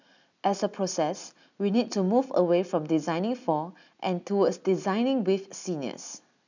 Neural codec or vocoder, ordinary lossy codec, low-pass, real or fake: none; none; 7.2 kHz; real